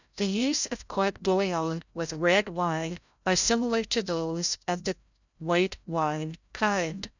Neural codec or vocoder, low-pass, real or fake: codec, 16 kHz, 0.5 kbps, FreqCodec, larger model; 7.2 kHz; fake